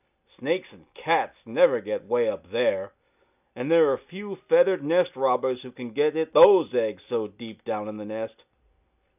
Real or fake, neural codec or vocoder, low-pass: real; none; 3.6 kHz